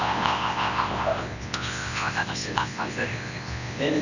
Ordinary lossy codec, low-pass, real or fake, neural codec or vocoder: none; 7.2 kHz; fake; codec, 24 kHz, 0.9 kbps, WavTokenizer, large speech release